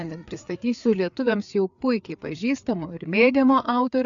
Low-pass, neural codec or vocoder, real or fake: 7.2 kHz; codec, 16 kHz, 4 kbps, FreqCodec, larger model; fake